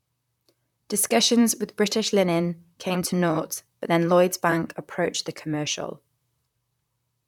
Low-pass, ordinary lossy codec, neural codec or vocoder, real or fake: 19.8 kHz; none; vocoder, 44.1 kHz, 128 mel bands, Pupu-Vocoder; fake